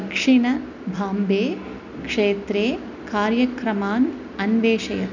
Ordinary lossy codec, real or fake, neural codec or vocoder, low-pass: none; real; none; 7.2 kHz